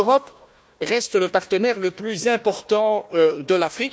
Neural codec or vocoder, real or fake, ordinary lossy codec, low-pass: codec, 16 kHz, 1 kbps, FunCodec, trained on Chinese and English, 50 frames a second; fake; none; none